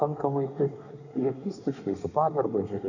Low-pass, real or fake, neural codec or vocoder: 7.2 kHz; fake; codec, 24 kHz, 1 kbps, SNAC